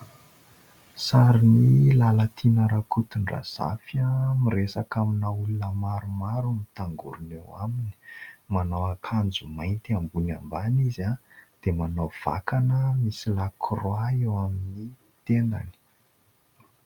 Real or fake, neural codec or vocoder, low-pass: real; none; 19.8 kHz